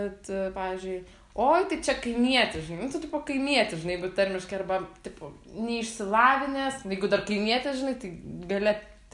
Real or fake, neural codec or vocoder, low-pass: real; none; 10.8 kHz